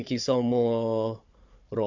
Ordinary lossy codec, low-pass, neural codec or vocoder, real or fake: Opus, 64 kbps; 7.2 kHz; codec, 16 kHz, 4 kbps, FunCodec, trained on Chinese and English, 50 frames a second; fake